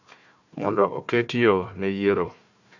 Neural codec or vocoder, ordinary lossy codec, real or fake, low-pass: codec, 16 kHz, 1 kbps, FunCodec, trained on Chinese and English, 50 frames a second; none; fake; 7.2 kHz